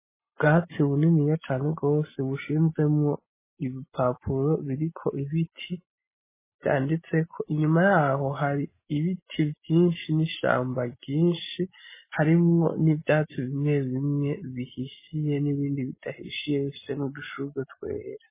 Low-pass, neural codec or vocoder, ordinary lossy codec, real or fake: 3.6 kHz; none; MP3, 16 kbps; real